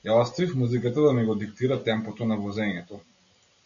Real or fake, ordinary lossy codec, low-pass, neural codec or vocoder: real; MP3, 96 kbps; 7.2 kHz; none